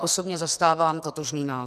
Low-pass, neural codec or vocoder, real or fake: 14.4 kHz; codec, 44.1 kHz, 2.6 kbps, SNAC; fake